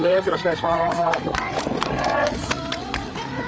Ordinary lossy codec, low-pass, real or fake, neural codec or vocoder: none; none; fake; codec, 16 kHz, 8 kbps, FreqCodec, larger model